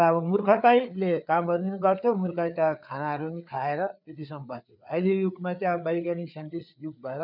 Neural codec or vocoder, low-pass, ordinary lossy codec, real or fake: codec, 16 kHz, 4 kbps, FreqCodec, larger model; 5.4 kHz; none; fake